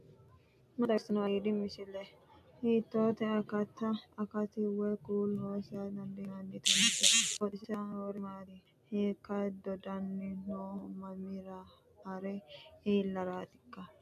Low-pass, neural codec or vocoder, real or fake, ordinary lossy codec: 14.4 kHz; none; real; AAC, 96 kbps